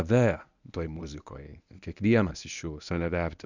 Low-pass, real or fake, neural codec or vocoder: 7.2 kHz; fake; codec, 24 kHz, 0.9 kbps, WavTokenizer, medium speech release version 1